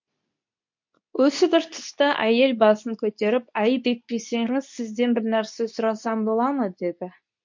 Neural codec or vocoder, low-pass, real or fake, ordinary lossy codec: codec, 24 kHz, 0.9 kbps, WavTokenizer, medium speech release version 2; 7.2 kHz; fake; MP3, 48 kbps